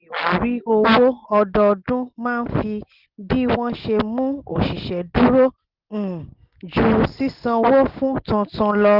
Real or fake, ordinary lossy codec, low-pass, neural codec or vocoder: real; Opus, 16 kbps; 5.4 kHz; none